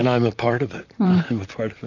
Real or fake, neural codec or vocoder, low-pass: fake; vocoder, 44.1 kHz, 128 mel bands, Pupu-Vocoder; 7.2 kHz